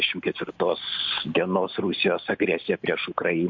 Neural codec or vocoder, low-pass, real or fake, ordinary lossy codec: none; 7.2 kHz; real; MP3, 48 kbps